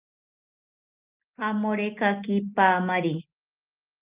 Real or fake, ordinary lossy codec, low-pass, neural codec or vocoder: real; Opus, 24 kbps; 3.6 kHz; none